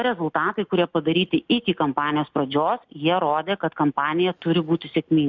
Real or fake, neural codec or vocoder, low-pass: real; none; 7.2 kHz